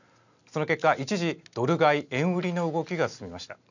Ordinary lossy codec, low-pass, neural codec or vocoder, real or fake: none; 7.2 kHz; none; real